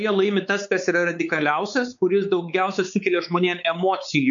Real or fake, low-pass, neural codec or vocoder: fake; 7.2 kHz; codec, 16 kHz, 4 kbps, X-Codec, WavLM features, trained on Multilingual LibriSpeech